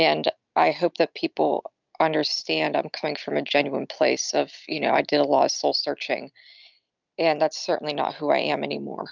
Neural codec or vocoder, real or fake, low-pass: none; real; 7.2 kHz